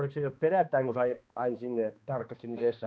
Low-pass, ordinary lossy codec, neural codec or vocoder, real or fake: none; none; codec, 16 kHz, 2 kbps, X-Codec, HuBERT features, trained on balanced general audio; fake